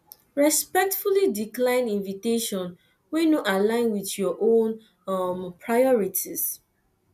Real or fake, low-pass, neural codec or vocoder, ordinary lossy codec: real; 14.4 kHz; none; none